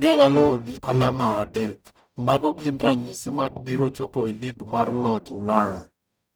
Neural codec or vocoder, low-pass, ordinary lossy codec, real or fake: codec, 44.1 kHz, 0.9 kbps, DAC; none; none; fake